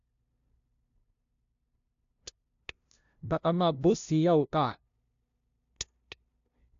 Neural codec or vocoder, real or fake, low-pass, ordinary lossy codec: codec, 16 kHz, 0.5 kbps, FunCodec, trained on LibriTTS, 25 frames a second; fake; 7.2 kHz; AAC, 64 kbps